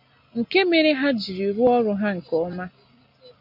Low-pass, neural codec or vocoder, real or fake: 5.4 kHz; none; real